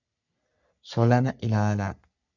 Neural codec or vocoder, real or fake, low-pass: codec, 44.1 kHz, 3.4 kbps, Pupu-Codec; fake; 7.2 kHz